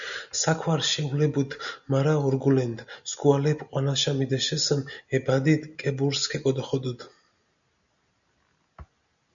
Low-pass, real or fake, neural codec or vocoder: 7.2 kHz; real; none